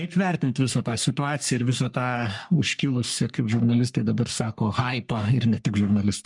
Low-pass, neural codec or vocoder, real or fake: 10.8 kHz; codec, 44.1 kHz, 3.4 kbps, Pupu-Codec; fake